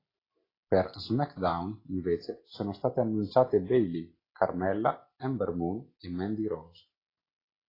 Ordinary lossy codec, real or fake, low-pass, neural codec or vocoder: AAC, 24 kbps; real; 5.4 kHz; none